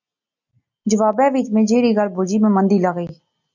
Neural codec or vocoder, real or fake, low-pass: none; real; 7.2 kHz